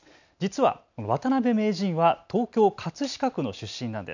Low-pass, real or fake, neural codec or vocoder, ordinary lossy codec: 7.2 kHz; real; none; none